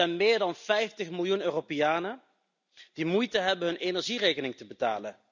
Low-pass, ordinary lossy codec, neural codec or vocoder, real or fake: 7.2 kHz; none; none; real